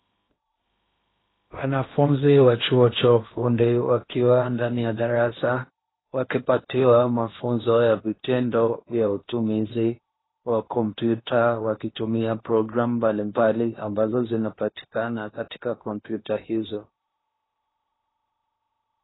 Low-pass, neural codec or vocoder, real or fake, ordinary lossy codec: 7.2 kHz; codec, 16 kHz in and 24 kHz out, 0.8 kbps, FocalCodec, streaming, 65536 codes; fake; AAC, 16 kbps